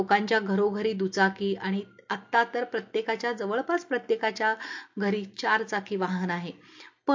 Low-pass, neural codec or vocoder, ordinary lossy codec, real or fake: 7.2 kHz; none; MP3, 48 kbps; real